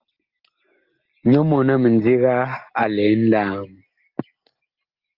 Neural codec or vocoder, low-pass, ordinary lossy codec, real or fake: none; 5.4 kHz; Opus, 32 kbps; real